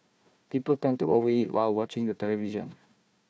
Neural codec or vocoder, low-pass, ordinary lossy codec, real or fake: codec, 16 kHz, 1 kbps, FunCodec, trained on Chinese and English, 50 frames a second; none; none; fake